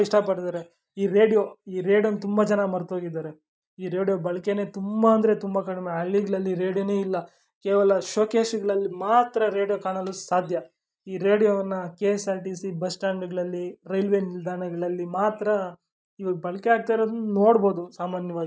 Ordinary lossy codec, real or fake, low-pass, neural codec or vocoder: none; real; none; none